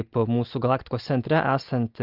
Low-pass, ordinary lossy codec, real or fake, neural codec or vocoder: 5.4 kHz; Opus, 24 kbps; real; none